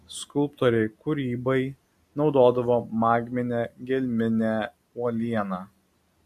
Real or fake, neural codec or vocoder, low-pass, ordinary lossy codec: real; none; 14.4 kHz; MP3, 64 kbps